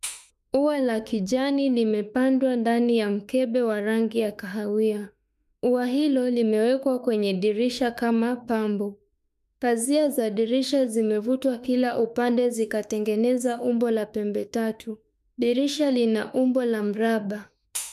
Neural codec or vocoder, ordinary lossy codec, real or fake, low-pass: autoencoder, 48 kHz, 32 numbers a frame, DAC-VAE, trained on Japanese speech; none; fake; 14.4 kHz